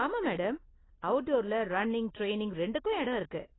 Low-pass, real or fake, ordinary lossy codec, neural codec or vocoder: 7.2 kHz; real; AAC, 16 kbps; none